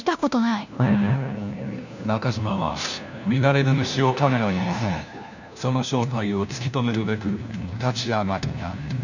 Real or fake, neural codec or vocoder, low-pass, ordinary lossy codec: fake; codec, 16 kHz, 1 kbps, FunCodec, trained on LibriTTS, 50 frames a second; 7.2 kHz; none